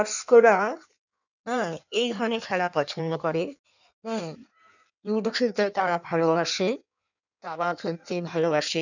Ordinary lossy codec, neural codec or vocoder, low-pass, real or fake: none; codec, 16 kHz in and 24 kHz out, 1.1 kbps, FireRedTTS-2 codec; 7.2 kHz; fake